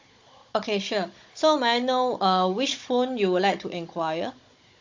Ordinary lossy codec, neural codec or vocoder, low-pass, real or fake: MP3, 48 kbps; codec, 16 kHz, 16 kbps, FunCodec, trained on Chinese and English, 50 frames a second; 7.2 kHz; fake